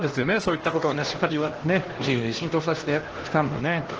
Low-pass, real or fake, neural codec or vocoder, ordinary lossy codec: 7.2 kHz; fake; codec, 16 kHz, 1 kbps, X-Codec, HuBERT features, trained on LibriSpeech; Opus, 16 kbps